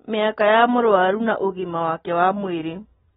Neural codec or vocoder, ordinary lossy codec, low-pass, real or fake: none; AAC, 16 kbps; 19.8 kHz; real